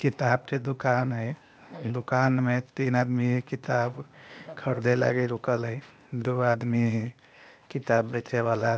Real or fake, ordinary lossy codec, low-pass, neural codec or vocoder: fake; none; none; codec, 16 kHz, 0.8 kbps, ZipCodec